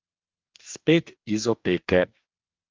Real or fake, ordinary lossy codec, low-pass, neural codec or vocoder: fake; Opus, 32 kbps; 7.2 kHz; codec, 44.1 kHz, 2.6 kbps, DAC